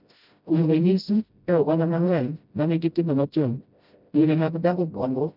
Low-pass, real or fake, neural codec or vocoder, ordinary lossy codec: 5.4 kHz; fake; codec, 16 kHz, 0.5 kbps, FreqCodec, smaller model; none